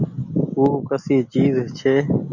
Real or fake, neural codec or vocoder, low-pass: real; none; 7.2 kHz